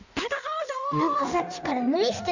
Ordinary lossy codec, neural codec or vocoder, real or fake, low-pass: none; codec, 16 kHz in and 24 kHz out, 1.1 kbps, FireRedTTS-2 codec; fake; 7.2 kHz